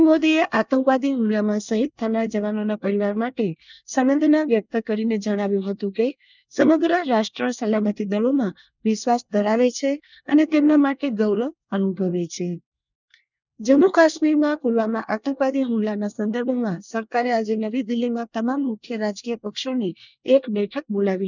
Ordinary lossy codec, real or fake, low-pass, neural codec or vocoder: none; fake; 7.2 kHz; codec, 24 kHz, 1 kbps, SNAC